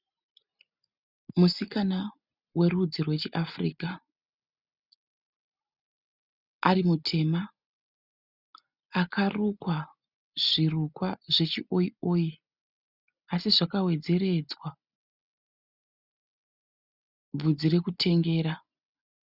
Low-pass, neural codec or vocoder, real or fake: 5.4 kHz; none; real